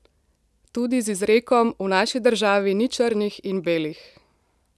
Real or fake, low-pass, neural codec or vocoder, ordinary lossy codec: real; none; none; none